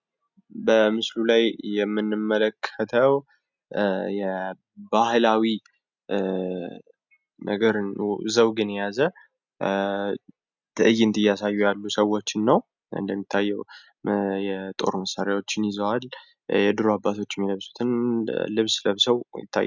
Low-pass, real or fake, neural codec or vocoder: 7.2 kHz; real; none